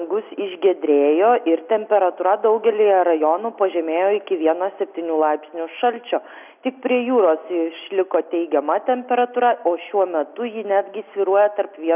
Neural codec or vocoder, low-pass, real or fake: none; 3.6 kHz; real